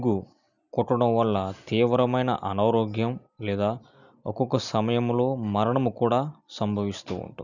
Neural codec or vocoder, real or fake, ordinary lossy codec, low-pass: none; real; none; 7.2 kHz